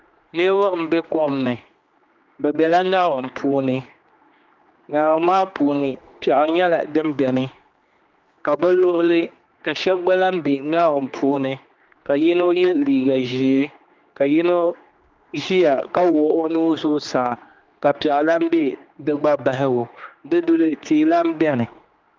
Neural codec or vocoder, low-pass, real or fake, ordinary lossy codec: codec, 16 kHz, 2 kbps, X-Codec, HuBERT features, trained on general audio; 7.2 kHz; fake; Opus, 24 kbps